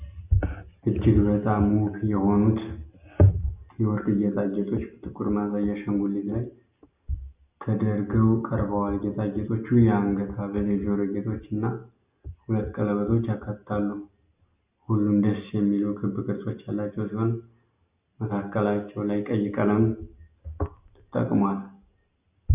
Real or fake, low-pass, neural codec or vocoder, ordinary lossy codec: real; 3.6 kHz; none; Opus, 64 kbps